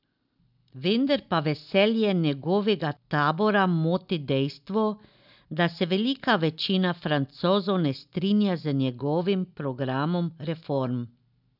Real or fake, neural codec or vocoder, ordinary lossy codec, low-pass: real; none; none; 5.4 kHz